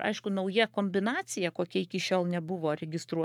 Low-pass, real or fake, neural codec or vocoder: 19.8 kHz; fake; codec, 44.1 kHz, 7.8 kbps, Pupu-Codec